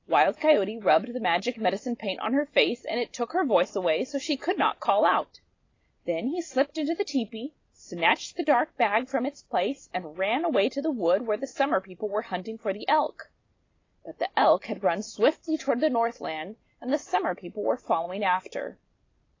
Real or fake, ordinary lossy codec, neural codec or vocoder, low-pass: real; AAC, 32 kbps; none; 7.2 kHz